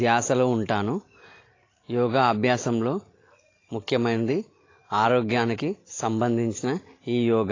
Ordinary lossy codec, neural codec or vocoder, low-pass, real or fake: AAC, 32 kbps; none; 7.2 kHz; real